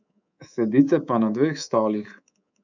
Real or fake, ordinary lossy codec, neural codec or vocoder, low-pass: fake; none; codec, 24 kHz, 3.1 kbps, DualCodec; 7.2 kHz